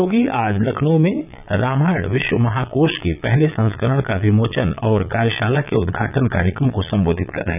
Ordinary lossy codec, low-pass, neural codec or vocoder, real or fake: none; 3.6 kHz; vocoder, 22.05 kHz, 80 mel bands, Vocos; fake